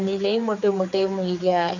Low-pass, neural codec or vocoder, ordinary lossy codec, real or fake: 7.2 kHz; codec, 16 kHz, 4 kbps, X-Codec, HuBERT features, trained on general audio; none; fake